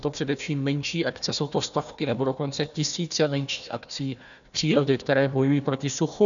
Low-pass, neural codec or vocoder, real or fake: 7.2 kHz; codec, 16 kHz, 1 kbps, FunCodec, trained on Chinese and English, 50 frames a second; fake